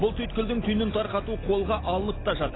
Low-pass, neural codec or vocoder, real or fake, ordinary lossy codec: 7.2 kHz; none; real; AAC, 16 kbps